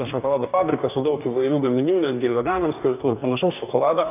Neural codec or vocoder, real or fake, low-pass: codec, 44.1 kHz, 2.6 kbps, DAC; fake; 3.6 kHz